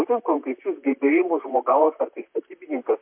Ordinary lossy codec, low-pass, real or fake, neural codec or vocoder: MP3, 32 kbps; 3.6 kHz; fake; vocoder, 44.1 kHz, 128 mel bands, Pupu-Vocoder